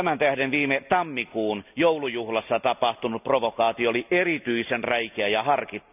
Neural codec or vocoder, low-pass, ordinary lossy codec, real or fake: none; 3.6 kHz; none; real